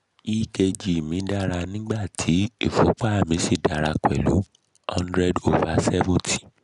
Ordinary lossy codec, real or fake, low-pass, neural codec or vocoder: none; real; 10.8 kHz; none